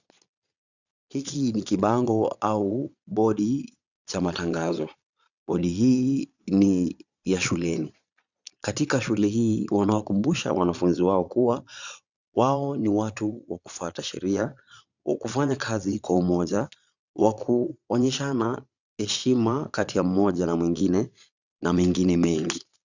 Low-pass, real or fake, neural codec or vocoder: 7.2 kHz; fake; vocoder, 22.05 kHz, 80 mel bands, Vocos